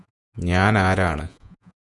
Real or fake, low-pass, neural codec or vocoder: fake; 10.8 kHz; vocoder, 48 kHz, 128 mel bands, Vocos